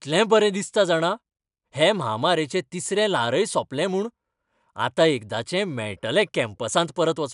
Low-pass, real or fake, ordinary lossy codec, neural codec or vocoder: 10.8 kHz; real; none; none